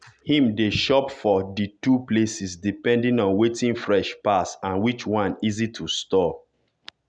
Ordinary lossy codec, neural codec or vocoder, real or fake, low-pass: none; none; real; 9.9 kHz